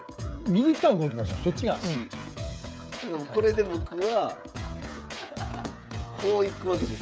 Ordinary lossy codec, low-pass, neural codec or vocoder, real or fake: none; none; codec, 16 kHz, 16 kbps, FreqCodec, smaller model; fake